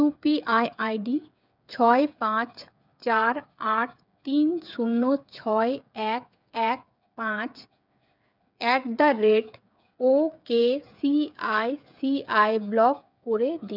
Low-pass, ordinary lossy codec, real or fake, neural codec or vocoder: 5.4 kHz; none; fake; codec, 16 kHz, 8 kbps, FreqCodec, smaller model